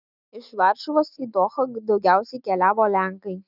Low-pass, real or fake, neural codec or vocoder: 5.4 kHz; real; none